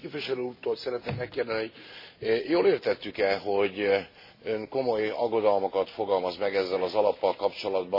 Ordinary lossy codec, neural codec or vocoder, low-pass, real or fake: MP3, 24 kbps; none; 5.4 kHz; real